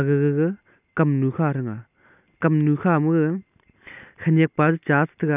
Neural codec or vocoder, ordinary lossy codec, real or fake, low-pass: none; none; real; 3.6 kHz